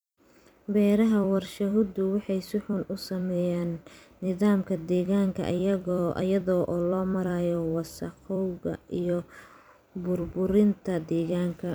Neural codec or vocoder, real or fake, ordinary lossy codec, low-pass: vocoder, 44.1 kHz, 128 mel bands every 256 samples, BigVGAN v2; fake; none; none